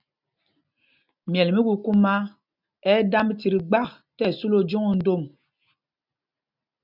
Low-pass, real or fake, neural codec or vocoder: 5.4 kHz; real; none